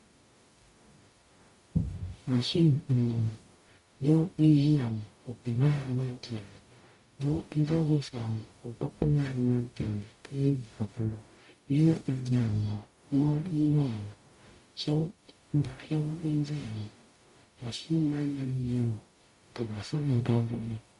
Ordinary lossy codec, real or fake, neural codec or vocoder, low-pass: MP3, 48 kbps; fake; codec, 44.1 kHz, 0.9 kbps, DAC; 14.4 kHz